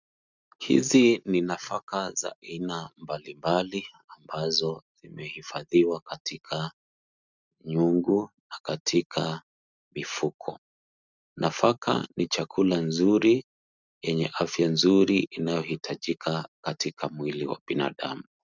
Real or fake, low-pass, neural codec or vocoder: real; 7.2 kHz; none